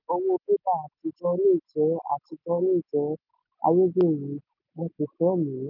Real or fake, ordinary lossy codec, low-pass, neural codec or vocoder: real; none; 5.4 kHz; none